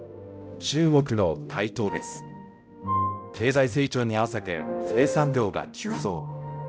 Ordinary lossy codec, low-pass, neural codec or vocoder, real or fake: none; none; codec, 16 kHz, 0.5 kbps, X-Codec, HuBERT features, trained on balanced general audio; fake